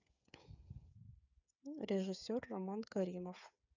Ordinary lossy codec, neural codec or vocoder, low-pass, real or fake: none; codec, 16 kHz, 8 kbps, FreqCodec, smaller model; 7.2 kHz; fake